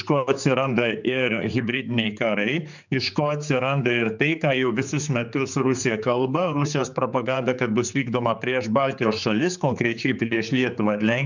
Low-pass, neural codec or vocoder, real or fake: 7.2 kHz; codec, 16 kHz, 4 kbps, X-Codec, HuBERT features, trained on general audio; fake